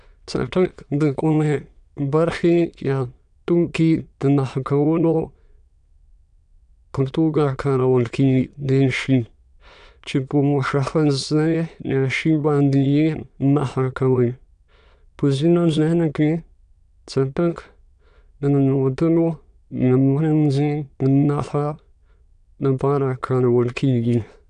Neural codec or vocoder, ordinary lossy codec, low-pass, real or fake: autoencoder, 22.05 kHz, a latent of 192 numbers a frame, VITS, trained on many speakers; none; 9.9 kHz; fake